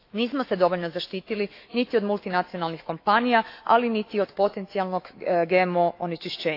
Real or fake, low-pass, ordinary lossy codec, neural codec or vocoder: fake; 5.4 kHz; AAC, 32 kbps; autoencoder, 48 kHz, 128 numbers a frame, DAC-VAE, trained on Japanese speech